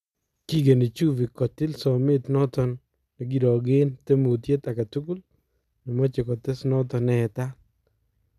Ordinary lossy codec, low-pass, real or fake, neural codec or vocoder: none; 14.4 kHz; real; none